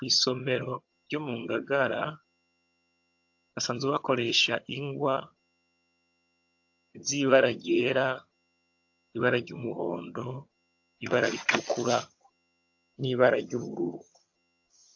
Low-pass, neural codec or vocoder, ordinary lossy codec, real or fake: 7.2 kHz; vocoder, 22.05 kHz, 80 mel bands, HiFi-GAN; AAC, 48 kbps; fake